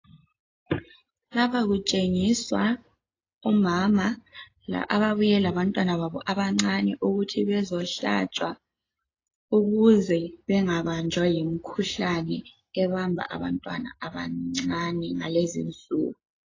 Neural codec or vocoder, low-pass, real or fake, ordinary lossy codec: none; 7.2 kHz; real; AAC, 32 kbps